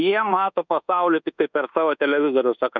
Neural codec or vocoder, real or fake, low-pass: codec, 24 kHz, 1.2 kbps, DualCodec; fake; 7.2 kHz